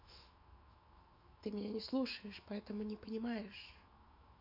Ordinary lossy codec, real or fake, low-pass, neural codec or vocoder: MP3, 48 kbps; fake; 5.4 kHz; autoencoder, 48 kHz, 128 numbers a frame, DAC-VAE, trained on Japanese speech